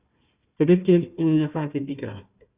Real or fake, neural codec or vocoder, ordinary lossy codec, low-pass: fake; codec, 16 kHz, 1 kbps, FunCodec, trained on Chinese and English, 50 frames a second; Opus, 64 kbps; 3.6 kHz